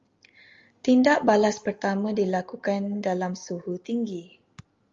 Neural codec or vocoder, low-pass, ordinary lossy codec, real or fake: none; 7.2 kHz; Opus, 32 kbps; real